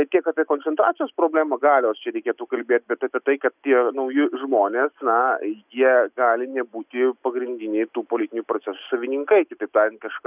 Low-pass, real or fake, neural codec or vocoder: 3.6 kHz; real; none